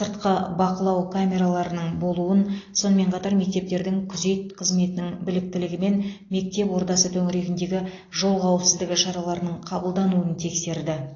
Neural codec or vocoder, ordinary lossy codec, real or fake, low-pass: none; AAC, 32 kbps; real; 7.2 kHz